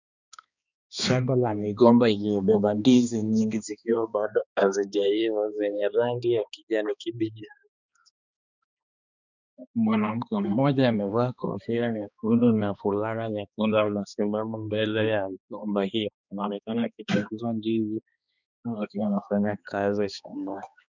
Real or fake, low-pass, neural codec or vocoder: fake; 7.2 kHz; codec, 16 kHz, 2 kbps, X-Codec, HuBERT features, trained on balanced general audio